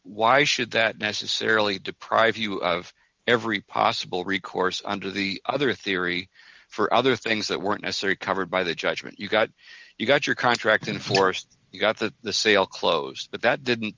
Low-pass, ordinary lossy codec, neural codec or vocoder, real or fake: 7.2 kHz; Opus, 32 kbps; none; real